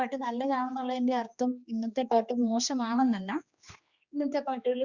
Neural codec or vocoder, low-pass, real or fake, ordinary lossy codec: codec, 16 kHz, 2 kbps, X-Codec, HuBERT features, trained on general audio; 7.2 kHz; fake; Opus, 64 kbps